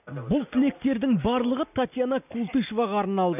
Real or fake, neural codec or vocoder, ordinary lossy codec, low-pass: real; none; none; 3.6 kHz